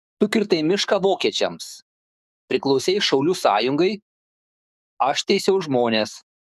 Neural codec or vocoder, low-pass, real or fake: codec, 44.1 kHz, 7.8 kbps, DAC; 14.4 kHz; fake